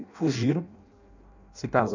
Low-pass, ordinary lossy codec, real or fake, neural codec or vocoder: 7.2 kHz; none; fake; codec, 16 kHz in and 24 kHz out, 0.6 kbps, FireRedTTS-2 codec